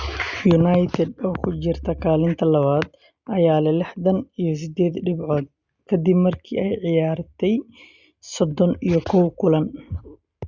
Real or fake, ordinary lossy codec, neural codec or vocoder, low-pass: real; Opus, 64 kbps; none; 7.2 kHz